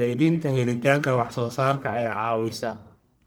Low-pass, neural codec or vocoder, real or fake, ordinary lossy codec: none; codec, 44.1 kHz, 1.7 kbps, Pupu-Codec; fake; none